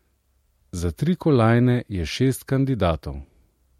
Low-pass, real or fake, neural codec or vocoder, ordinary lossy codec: 19.8 kHz; real; none; MP3, 64 kbps